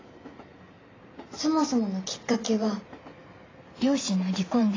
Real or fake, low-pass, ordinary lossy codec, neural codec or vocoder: fake; 7.2 kHz; AAC, 32 kbps; vocoder, 44.1 kHz, 128 mel bands every 512 samples, BigVGAN v2